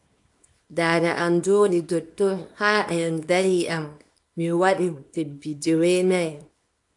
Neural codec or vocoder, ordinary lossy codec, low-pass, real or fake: codec, 24 kHz, 0.9 kbps, WavTokenizer, small release; AAC, 64 kbps; 10.8 kHz; fake